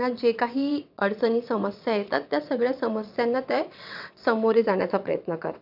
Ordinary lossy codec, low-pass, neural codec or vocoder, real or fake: none; 5.4 kHz; vocoder, 22.05 kHz, 80 mel bands, WaveNeXt; fake